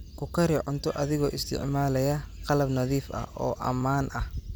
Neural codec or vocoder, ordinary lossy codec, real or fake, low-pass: none; none; real; none